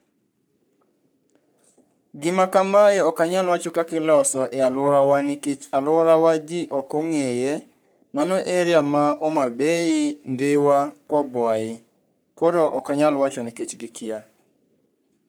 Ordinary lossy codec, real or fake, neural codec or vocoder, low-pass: none; fake; codec, 44.1 kHz, 3.4 kbps, Pupu-Codec; none